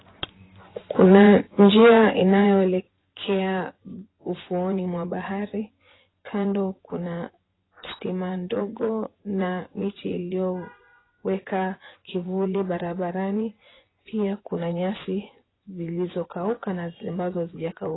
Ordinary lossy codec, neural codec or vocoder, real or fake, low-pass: AAC, 16 kbps; vocoder, 24 kHz, 100 mel bands, Vocos; fake; 7.2 kHz